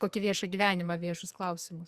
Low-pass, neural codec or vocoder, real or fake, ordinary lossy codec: 14.4 kHz; codec, 44.1 kHz, 2.6 kbps, SNAC; fake; Opus, 64 kbps